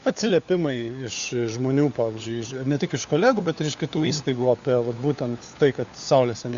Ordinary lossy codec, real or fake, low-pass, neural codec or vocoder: Opus, 64 kbps; fake; 7.2 kHz; codec, 16 kHz, 4 kbps, FunCodec, trained on LibriTTS, 50 frames a second